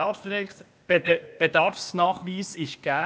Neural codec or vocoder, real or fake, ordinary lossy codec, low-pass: codec, 16 kHz, 0.8 kbps, ZipCodec; fake; none; none